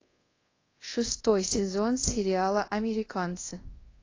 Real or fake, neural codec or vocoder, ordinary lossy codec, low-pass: fake; codec, 24 kHz, 0.5 kbps, DualCodec; AAC, 32 kbps; 7.2 kHz